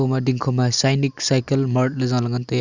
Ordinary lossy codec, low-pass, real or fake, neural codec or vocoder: Opus, 64 kbps; 7.2 kHz; real; none